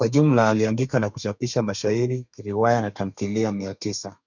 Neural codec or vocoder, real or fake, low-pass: codec, 32 kHz, 1.9 kbps, SNAC; fake; 7.2 kHz